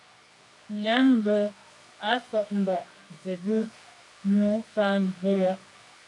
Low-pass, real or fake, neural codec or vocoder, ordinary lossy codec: 10.8 kHz; fake; codec, 24 kHz, 0.9 kbps, WavTokenizer, medium music audio release; MP3, 96 kbps